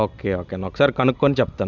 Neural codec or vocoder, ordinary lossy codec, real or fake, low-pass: none; none; real; 7.2 kHz